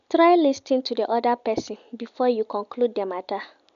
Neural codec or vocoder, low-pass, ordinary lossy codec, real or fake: none; 7.2 kHz; none; real